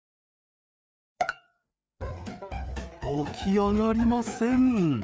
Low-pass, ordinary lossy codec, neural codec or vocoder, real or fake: none; none; codec, 16 kHz, 4 kbps, FreqCodec, larger model; fake